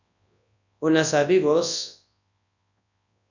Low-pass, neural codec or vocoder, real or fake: 7.2 kHz; codec, 24 kHz, 0.9 kbps, WavTokenizer, large speech release; fake